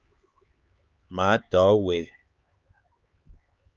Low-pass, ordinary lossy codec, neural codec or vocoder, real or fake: 7.2 kHz; Opus, 24 kbps; codec, 16 kHz, 4 kbps, X-Codec, HuBERT features, trained on LibriSpeech; fake